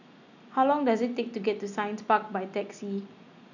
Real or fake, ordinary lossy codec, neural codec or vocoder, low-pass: real; none; none; 7.2 kHz